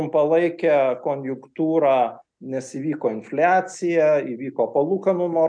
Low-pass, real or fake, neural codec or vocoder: 9.9 kHz; real; none